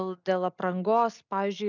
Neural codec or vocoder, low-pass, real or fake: none; 7.2 kHz; real